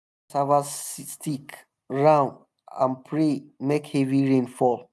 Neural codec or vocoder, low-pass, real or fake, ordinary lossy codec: none; none; real; none